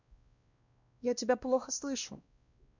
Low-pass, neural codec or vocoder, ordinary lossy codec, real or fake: 7.2 kHz; codec, 16 kHz, 1 kbps, X-Codec, WavLM features, trained on Multilingual LibriSpeech; none; fake